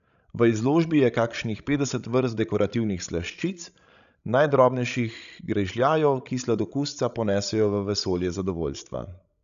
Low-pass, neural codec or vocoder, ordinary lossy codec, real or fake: 7.2 kHz; codec, 16 kHz, 16 kbps, FreqCodec, larger model; none; fake